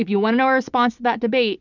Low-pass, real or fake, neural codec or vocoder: 7.2 kHz; real; none